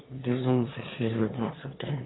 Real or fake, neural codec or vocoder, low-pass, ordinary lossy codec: fake; autoencoder, 22.05 kHz, a latent of 192 numbers a frame, VITS, trained on one speaker; 7.2 kHz; AAC, 16 kbps